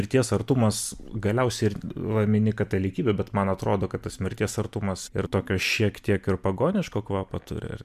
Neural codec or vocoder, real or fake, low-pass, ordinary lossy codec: none; real; 14.4 kHz; MP3, 96 kbps